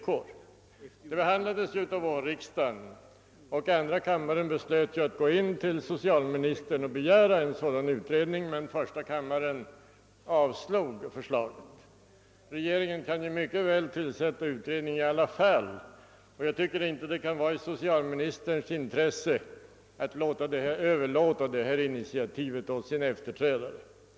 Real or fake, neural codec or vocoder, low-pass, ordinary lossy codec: real; none; none; none